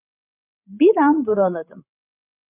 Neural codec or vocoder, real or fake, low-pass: codec, 16 kHz, 16 kbps, FreqCodec, larger model; fake; 3.6 kHz